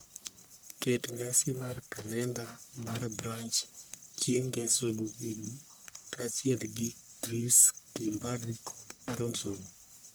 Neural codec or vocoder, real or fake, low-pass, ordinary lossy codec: codec, 44.1 kHz, 1.7 kbps, Pupu-Codec; fake; none; none